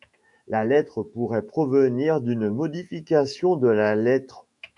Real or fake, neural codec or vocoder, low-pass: fake; autoencoder, 48 kHz, 128 numbers a frame, DAC-VAE, trained on Japanese speech; 10.8 kHz